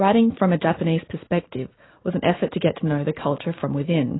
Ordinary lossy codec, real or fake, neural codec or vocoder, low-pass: AAC, 16 kbps; real; none; 7.2 kHz